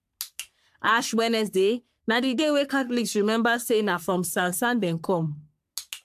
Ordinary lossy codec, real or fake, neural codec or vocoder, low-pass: AAC, 96 kbps; fake; codec, 44.1 kHz, 3.4 kbps, Pupu-Codec; 14.4 kHz